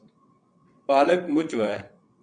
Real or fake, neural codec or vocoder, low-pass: fake; vocoder, 22.05 kHz, 80 mel bands, WaveNeXt; 9.9 kHz